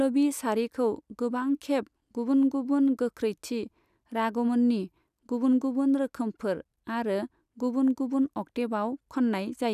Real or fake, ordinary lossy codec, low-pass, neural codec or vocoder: real; none; 14.4 kHz; none